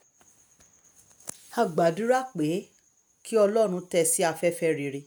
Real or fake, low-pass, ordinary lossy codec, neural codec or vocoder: real; none; none; none